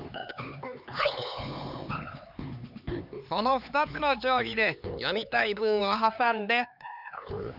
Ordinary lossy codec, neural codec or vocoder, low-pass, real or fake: none; codec, 16 kHz, 4 kbps, X-Codec, HuBERT features, trained on LibriSpeech; 5.4 kHz; fake